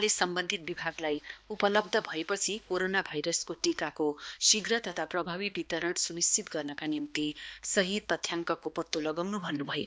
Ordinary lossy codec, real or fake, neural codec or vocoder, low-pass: none; fake; codec, 16 kHz, 2 kbps, X-Codec, HuBERT features, trained on balanced general audio; none